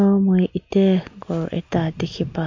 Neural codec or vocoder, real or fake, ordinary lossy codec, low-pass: none; real; MP3, 48 kbps; 7.2 kHz